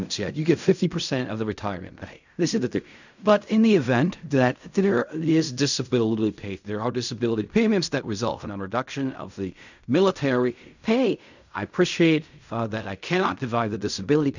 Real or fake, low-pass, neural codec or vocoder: fake; 7.2 kHz; codec, 16 kHz in and 24 kHz out, 0.4 kbps, LongCat-Audio-Codec, fine tuned four codebook decoder